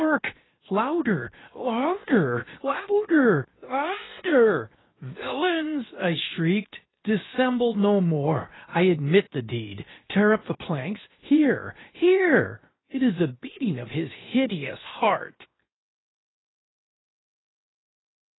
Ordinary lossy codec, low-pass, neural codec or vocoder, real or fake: AAC, 16 kbps; 7.2 kHz; codec, 16 kHz, 0.7 kbps, FocalCodec; fake